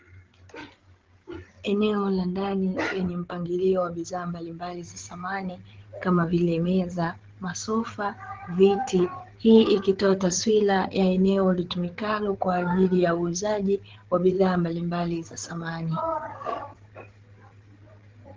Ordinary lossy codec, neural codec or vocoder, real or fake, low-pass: Opus, 16 kbps; codec, 16 kHz, 8 kbps, FreqCodec, larger model; fake; 7.2 kHz